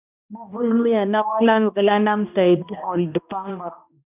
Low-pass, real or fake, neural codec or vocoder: 3.6 kHz; fake; codec, 16 kHz, 1 kbps, X-Codec, HuBERT features, trained on balanced general audio